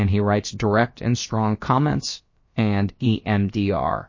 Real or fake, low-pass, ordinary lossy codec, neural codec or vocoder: fake; 7.2 kHz; MP3, 32 kbps; codec, 16 kHz, about 1 kbps, DyCAST, with the encoder's durations